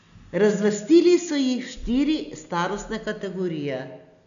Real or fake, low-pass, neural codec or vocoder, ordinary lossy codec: real; 7.2 kHz; none; none